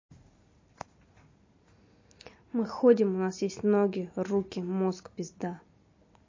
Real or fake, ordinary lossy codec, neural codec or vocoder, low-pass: real; MP3, 32 kbps; none; 7.2 kHz